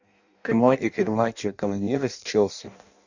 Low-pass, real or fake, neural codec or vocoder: 7.2 kHz; fake; codec, 16 kHz in and 24 kHz out, 0.6 kbps, FireRedTTS-2 codec